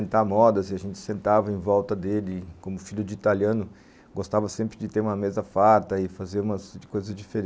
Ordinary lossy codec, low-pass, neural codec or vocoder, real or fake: none; none; none; real